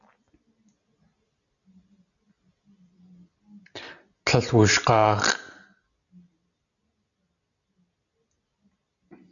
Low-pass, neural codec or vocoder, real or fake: 7.2 kHz; none; real